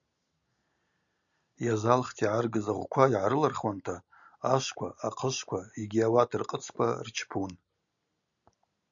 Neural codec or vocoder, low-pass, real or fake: none; 7.2 kHz; real